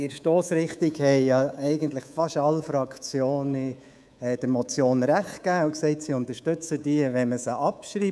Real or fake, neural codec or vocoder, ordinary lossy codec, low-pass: fake; codec, 24 kHz, 3.1 kbps, DualCodec; none; none